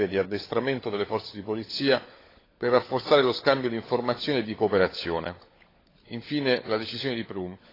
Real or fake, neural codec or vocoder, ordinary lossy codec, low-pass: fake; codec, 16 kHz, 16 kbps, FunCodec, trained on LibriTTS, 50 frames a second; AAC, 24 kbps; 5.4 kHz